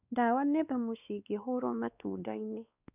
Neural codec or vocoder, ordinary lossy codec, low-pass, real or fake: codec, 16 kHz, 2 kbps, X-Codec, WavLM features, trained on Multilingual LibriSpeech; none; 3.6 kHz; fake